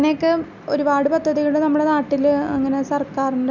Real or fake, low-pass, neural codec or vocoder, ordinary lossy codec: real; 7.2 kHz; none; none